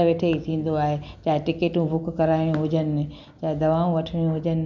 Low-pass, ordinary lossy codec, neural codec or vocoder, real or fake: 7.2 kHz; none; none; real